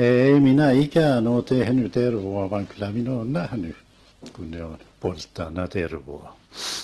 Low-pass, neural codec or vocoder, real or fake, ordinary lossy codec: 10.8 kHz; none; real; Opus, 16 kbps